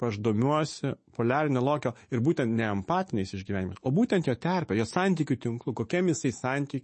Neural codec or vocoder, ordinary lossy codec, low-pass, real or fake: autoencoder, 48 kHz, 128 numbers a frame, DAC-VAE, trained on Japanese speech; MP3, 32 kbps; 10.8 kHz; fake